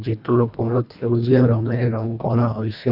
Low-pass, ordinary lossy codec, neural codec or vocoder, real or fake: 5.4 kHz; none; codec, 24 kHz, 1.5 kbps, HILCodec; fake